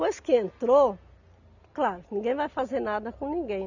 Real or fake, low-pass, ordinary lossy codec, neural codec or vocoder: real; 7.2 kHz; none; none